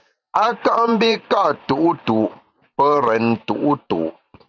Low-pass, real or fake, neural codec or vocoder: 7.2 kHz; fake; vocoder, 44.1 kHz, 128 mel bands every 512 samples, BigVGAN v2